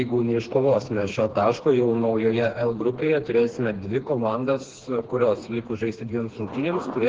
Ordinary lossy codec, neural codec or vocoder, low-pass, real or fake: Opus, 16 kbps; codec, 16 kHz, 2 kbps, FreqCodec, smaller model; 7.2 kHz; fake